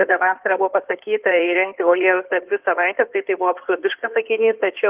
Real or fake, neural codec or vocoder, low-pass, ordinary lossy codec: fake; codec, 16 kHz in and 24 kHz out, 2.2 kbps, FireRedTTS-2 codec; 3.6 kHz; Opus, 32 kbps